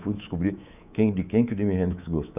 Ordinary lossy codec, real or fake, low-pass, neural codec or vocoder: none; fake; 3.6 kHz; codec, 24 kHz, 3.1 kbps, DualCodec